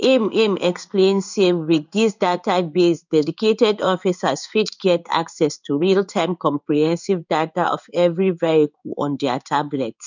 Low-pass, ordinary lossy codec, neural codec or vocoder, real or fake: 7.2 kHz; none; codec, 16 kHz in and 24 kHz out, 1 kbps, XY-Tokenizer; fake